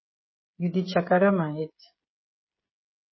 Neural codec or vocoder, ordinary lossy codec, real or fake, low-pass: codec, 16 kHz, 16 kbps, FreqCodec, smaller model; MP3, 24 kbps; fake; 7.2 kHz